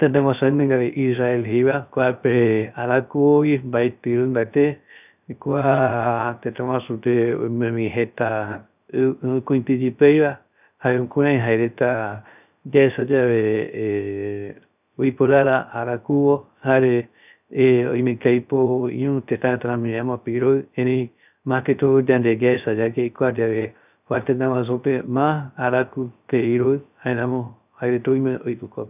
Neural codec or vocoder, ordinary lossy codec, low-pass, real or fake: codec, 16 kHz, 0.3 kbps, FocalCodec; none; 3.6 kHz; fake